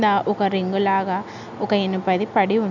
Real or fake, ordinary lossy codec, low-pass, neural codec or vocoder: real; none; 7.2 kHz; none